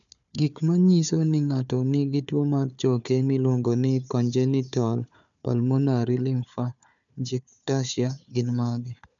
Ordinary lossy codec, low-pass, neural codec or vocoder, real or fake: none; 7.2 kHz; codec, 16 kHz, 4 kbps, FunCodec, trained on Chinese and English, 50 frames a second; fake